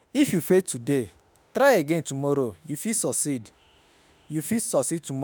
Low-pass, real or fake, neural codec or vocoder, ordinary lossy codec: none; fake; autoencoder, 48 kHz, 32 numbers a frame, DAC-VAE, trained on Japanese speech; none